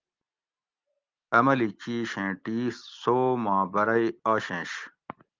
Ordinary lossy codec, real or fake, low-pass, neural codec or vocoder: Opus, 32 kbps; real; 7.2 kHz; none